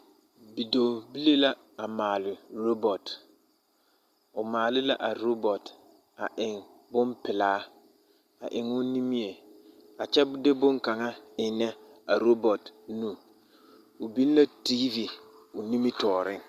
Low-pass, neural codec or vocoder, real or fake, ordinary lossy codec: 14.4 kHz; none; real; Opus, 64 kbps